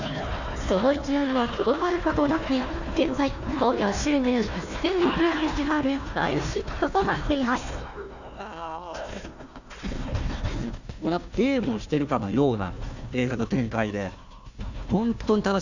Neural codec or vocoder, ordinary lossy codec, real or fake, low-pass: codec, 16 kHz, 1 kbps, FunCodec, trained on Chinese and English, 50 frames a second; none; fake; 7.2 kHz